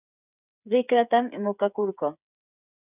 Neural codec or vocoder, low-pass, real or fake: codec, 16 kHz, 8 kbps, FreqCodec, smaller model; 3.6 kHz; fake